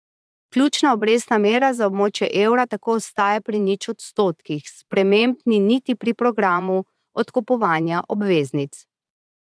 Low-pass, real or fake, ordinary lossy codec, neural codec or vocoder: none; fake; none; vocoder, 22.05 kHz, 80 mel bands, Vocos